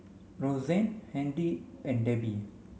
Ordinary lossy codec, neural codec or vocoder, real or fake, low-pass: none; none; real; none